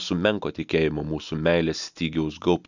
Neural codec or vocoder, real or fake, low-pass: none; real; 7.2 kHz